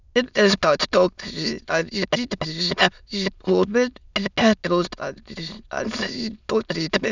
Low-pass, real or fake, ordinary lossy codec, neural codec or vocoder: 7.2 kHz; fake; none; autoencoder, 22.05 kHz, a latent of 192 numbers a frame, VITS, trained on many speakers